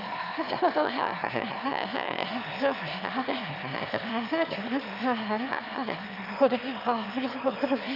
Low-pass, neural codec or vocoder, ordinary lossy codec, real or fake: 5.4 kHz; autoencoder, 22.05 kHz, a latent of 192 numbers a frame, VITS, trained on one speaker; none; fake